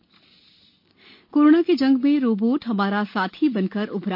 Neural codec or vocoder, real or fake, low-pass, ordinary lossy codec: none; real; 5.4 kHz; MP3, 32 kbps